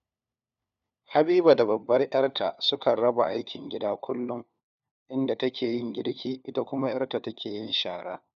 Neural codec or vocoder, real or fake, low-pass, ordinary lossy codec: codec, 16 kHz, 4 kbps, FunCodec, trained on LibriTTS, 50 frames a second; fake; 7.2 kHz; none